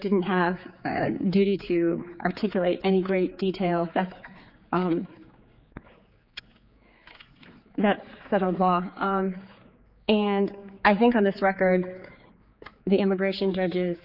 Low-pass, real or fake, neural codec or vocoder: 5.4 kHz; fake; codec, 16 kHz, 4 kbps, X-Codec, HuBERT features, trained on general audio